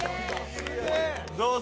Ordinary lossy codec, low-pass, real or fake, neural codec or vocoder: none; none; real; none